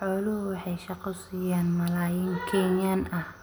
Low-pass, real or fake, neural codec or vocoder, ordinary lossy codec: none; real; none; none